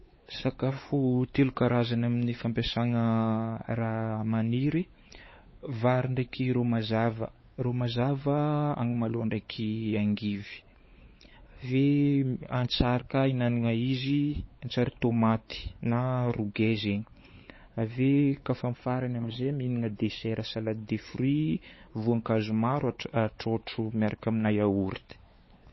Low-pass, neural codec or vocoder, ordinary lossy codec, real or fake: 7.2 kHz; codec, 16 kHz, 4 kbps, X-Codec, WavLM features, trained on Multilingual LibriSpeech; MP3, 24 kbps; fake